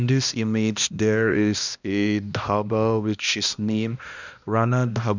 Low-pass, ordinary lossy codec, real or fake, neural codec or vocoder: 7.2 kHz; none; fake; codec, 16 kHz, 1 kbps, X-Codec, HuBERT features, trained on balanced general audio